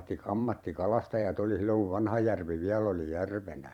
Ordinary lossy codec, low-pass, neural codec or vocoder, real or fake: none; 19.8 kHz; vocoder, 44.1 kHz, 128 mel bands every 512 samples, BigVGAN v2; fake